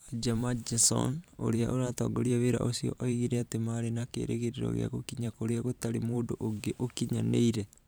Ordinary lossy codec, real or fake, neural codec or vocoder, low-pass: none; fake; vocoder, 44.1 kHz, 128 mel bands every 512 samples, BigVGAN v2; none